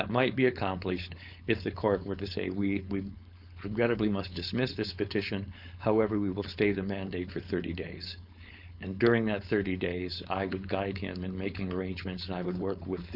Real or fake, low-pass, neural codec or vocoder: fake; 5.4 kHz; codec, 16 kHz, 4.8 kbps, FACodec